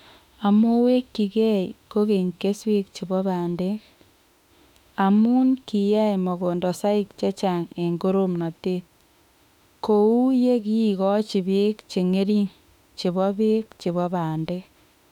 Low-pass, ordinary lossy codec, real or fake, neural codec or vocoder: 19.8 kHz; none; fake; autoencoder, 48 kHz, 32 numbers a frame, DAC-VAE, trained on Japanese speech